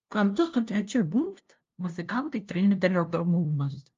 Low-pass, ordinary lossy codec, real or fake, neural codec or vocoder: 7.2 kHz; Opus, 32 kbps; fake; codec, 16 kHz, 0.5 kbps, FunCodec, trained on LibriTTS, 25 frames a second